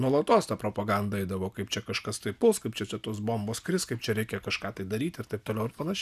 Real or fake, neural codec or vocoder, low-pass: real; none; 14.4 kHz